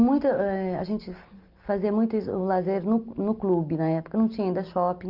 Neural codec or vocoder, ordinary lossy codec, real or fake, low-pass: none; Opus, 24 kbps; real; 5.4 kHz